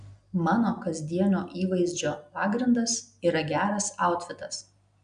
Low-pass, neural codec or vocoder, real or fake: 9.9 kHz; none; real